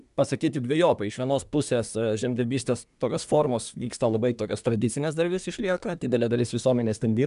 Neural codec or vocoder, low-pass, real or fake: codec, 24 kHz, 1 kbps, SNAC; 10.8 kHz; fake